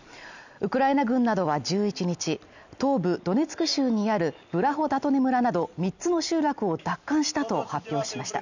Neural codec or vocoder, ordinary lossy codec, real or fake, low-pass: none; none; real; 7.2 kHz